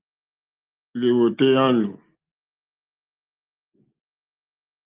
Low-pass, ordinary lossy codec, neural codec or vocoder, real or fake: 3.6 kHz; Opus, 32 kbps; none; real